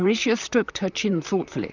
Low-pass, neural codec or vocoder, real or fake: 7.2 kHz; vocoder, 44.1 kHz, 128 mel bands, Pupu-Vocoder; fake